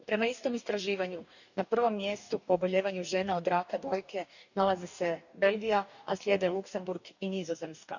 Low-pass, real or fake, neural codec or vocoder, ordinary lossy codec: 7.2 kHz; fake; codec, 44.1 kHz, 2.6 kbps, DAC; none